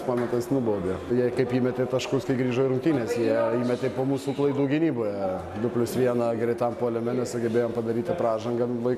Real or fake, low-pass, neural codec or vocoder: real; 14.4 kHz; none